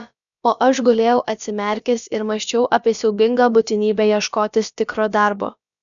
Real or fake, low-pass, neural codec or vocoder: fake; 7.2 kHz; codec, 16 kHz, about 1 kbps, DyCAST, with the encoder's durations